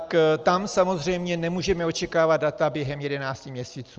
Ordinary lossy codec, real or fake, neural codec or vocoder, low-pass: Opus, 24 kbps; real; none; 7.2 kHz